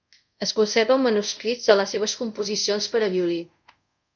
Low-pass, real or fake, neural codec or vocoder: 7.2 kHz; fake; codec, 24 kHz, 0.5 kbps, DualCodec